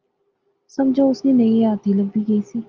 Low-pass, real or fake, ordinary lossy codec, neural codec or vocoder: 7.2 kHz; real; Opus, 24 kbps; none